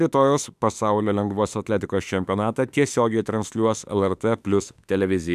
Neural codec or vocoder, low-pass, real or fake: autoencoder, 48 kHz, 32 numbers a frame, DAC-VAE, trained on Japanese speech; 14.4 kHz; fake